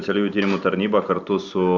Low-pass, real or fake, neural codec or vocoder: 7.2 kHz; real; none